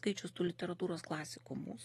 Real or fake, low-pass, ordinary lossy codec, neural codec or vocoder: real; 19.8 kHz; AAC, 32 kbps; none